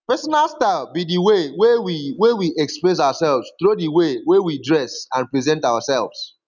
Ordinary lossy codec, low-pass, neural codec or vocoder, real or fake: none; 7.2 kHz; none; real